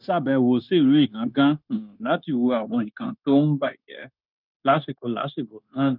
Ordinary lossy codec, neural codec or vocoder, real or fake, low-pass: none; codec, 16 kHz, 0.9 kbps, LongCat-Audio-Codec; fake; 5.4 kHz